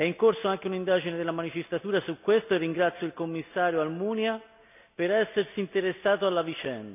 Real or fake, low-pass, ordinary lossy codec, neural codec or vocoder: real; 3.6 kHz; none; none